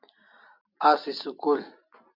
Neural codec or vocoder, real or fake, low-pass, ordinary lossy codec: none; real; 5.4 kHz; MP3, 48 kbps